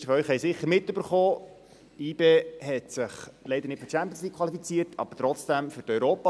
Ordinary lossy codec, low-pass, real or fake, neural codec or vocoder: none; none; real; none